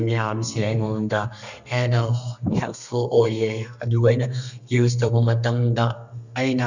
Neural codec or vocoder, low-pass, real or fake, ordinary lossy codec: codec, 32 kHz, 1.9 kbps, SNAC; 7.2 kHz; fake; none